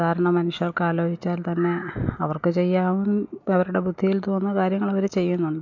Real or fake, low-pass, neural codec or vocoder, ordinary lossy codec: real; 7.2 kHz; none; MP3, 48 kbps